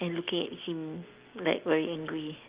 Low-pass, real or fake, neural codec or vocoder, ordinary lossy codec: 3.6 kHz; real; none; Opus, 32 kbps